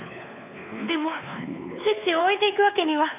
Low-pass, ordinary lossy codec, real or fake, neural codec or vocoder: 3.6 kHz; none; fake; codec, 16 kHz, 2 kbps, X-Codec, WavLM features, trained on Multilingual LibriSpeech